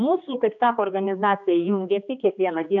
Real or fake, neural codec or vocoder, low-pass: fake; codec, 16 kHz, 2 kbps, X-Codec, HuBERT features, trained on general audio; 7.2 kHz